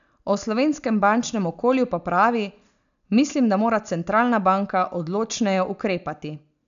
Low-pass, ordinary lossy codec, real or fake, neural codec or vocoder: 7.2 kHz; none; real; none